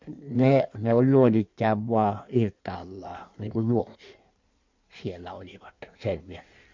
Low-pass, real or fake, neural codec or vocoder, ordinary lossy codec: 7.2 kHz; fake; codec, 16 kHz in and 24 kHz out, 1.1 kbps, FireRedTTS-2 codec; MP3, 64 kbps